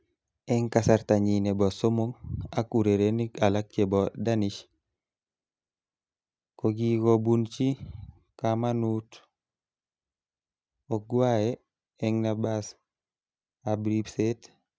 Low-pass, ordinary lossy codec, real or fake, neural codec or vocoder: none; none; real; none